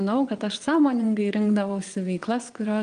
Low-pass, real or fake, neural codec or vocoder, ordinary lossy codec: 9.9 kHz; fake; vocoder, 22.05 kHz, 80 mel bands, WaveNeXt; Opus, 32 kbps